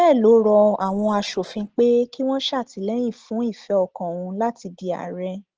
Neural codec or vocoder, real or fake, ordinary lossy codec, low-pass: none; real; Opus, 16 kbps; 7.2 kHz